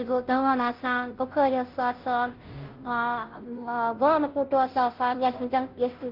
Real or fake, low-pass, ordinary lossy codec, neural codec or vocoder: fake; 5.4 kHz; Opus, 16 kbps; codec, 16 kHz, 0.5 kbps, FunCodec, trained on Chinese and English, 25 frames a second